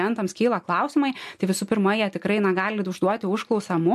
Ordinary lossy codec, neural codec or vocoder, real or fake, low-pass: MP3, 64 kbps; none; real; 14.4 kHz